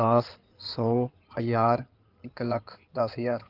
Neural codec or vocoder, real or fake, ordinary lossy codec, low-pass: codec, 16 kHz in and 24 kHz out, 2.2 kbps, FireRedTTS-2 codec; fake; Opus, 32 kbps; 5.4 kHz